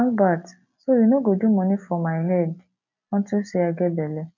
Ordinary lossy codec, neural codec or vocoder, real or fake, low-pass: none; none; real; 7.2 kHz